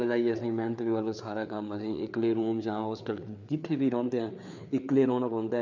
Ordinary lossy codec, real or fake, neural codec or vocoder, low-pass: none; fake; codec, 16 kHz, 4 kbps, FreqCodec, larger model; 7.2 kHz